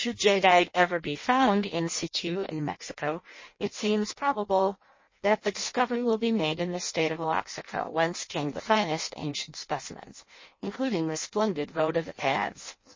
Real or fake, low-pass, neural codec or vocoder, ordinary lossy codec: fake; 7.2 kHz; codec, 16 kHz in and 24 kHz out, 0.6 kbps, FireRedTTS-2 codec; MP3, 32 kbps